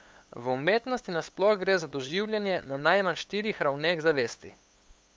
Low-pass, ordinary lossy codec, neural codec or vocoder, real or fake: none; none; codec, 16 kHz, 8 kbps, FunCodec, trained on LibriTTS, 25 frames a second; fake